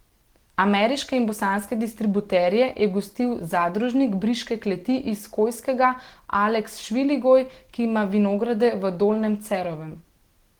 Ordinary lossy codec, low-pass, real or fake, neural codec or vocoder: Opus, 16 kbps; 19.8 kHz; real; none